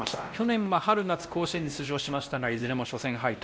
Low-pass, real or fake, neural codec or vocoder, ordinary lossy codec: none; fake; codec, 16 kHz, 1 kbps, X-Codec, WavLM features, trained on Multilingual LibriSpeech; none